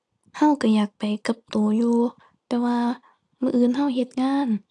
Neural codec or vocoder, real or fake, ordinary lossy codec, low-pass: none; real; AAC, 64 kbps; 10.8 kHz